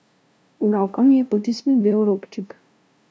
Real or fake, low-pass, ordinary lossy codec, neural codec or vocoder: fake; none; none; codec, 16 kHz, 0.5 kbps, FunCodec, trained on LibriTTS, 25 frames a second